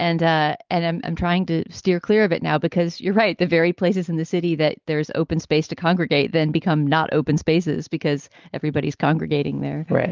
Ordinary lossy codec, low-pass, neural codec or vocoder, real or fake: Opus, 24 kbps; 7.2 kHz; none; real